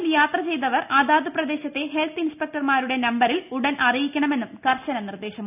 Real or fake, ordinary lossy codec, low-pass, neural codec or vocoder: real; none; 3.6 kHz; none